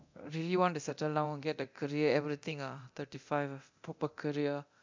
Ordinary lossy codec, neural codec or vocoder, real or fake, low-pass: MP3, 64 kbps; codec, 24 kHz, 0.9 kbps, DualCodec; fake; 7.2 kHz